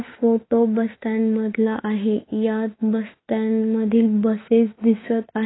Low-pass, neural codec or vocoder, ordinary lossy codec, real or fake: 7.2 kHz; autoencoder, 48 kHz, 32 numbers a frame, DAC-VAE, trained on Japanese speech; AAC, 16 kbps; fake